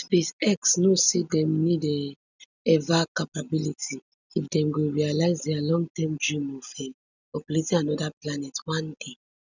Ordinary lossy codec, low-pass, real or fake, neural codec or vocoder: none; 7.2 kHz; real; none